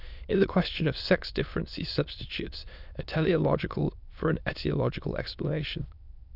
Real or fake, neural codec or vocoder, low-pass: fake; autoencoder, 22.05 kHz, a latent of 192 numbers a frame, VITS, trained on many speakers; 5.4 kHz